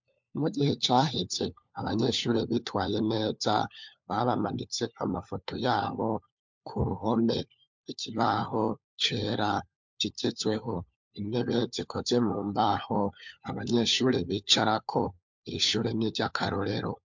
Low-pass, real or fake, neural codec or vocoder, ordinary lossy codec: 7.2 kHz; fake; codec, 16 kHz, 4 kbps, FunCodec, trained on LibriTTS, 50 frames a second; MP3, 64 kbps